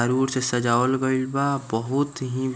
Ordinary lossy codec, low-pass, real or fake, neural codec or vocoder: none; none; real; none